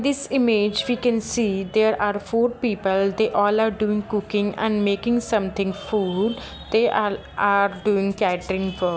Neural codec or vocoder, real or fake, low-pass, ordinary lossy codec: none; real; none; none